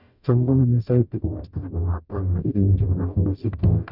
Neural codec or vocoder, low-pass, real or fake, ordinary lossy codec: codec, 44.1 kHz, 0.9 kbps, DAC; 5.4 kHz; fake; none